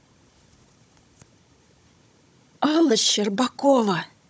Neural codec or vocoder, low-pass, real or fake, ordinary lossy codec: codec, 16 kHz, 16 kbps, FunCodec, trained on Chinese and English, 50 frames a second; none; fake; none